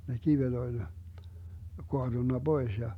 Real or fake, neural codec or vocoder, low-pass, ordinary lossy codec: real; none; 19.8 kHz; none